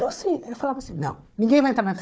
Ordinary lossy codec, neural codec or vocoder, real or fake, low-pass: none; codec, 16 kHz, 4 kbps, FunCodec, trained on Chinese and English, 50 frames a second; fake; none